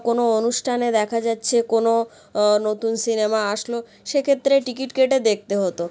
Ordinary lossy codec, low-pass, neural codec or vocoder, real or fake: none; none; none; real